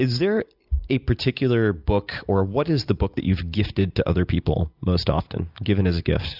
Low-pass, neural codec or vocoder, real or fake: 5.4 kHz; none; real